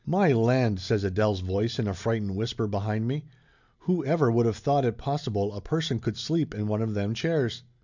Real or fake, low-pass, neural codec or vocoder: real; 7.2 kHz; none